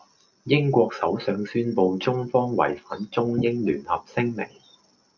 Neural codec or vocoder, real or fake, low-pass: none; real; 7.2 kHz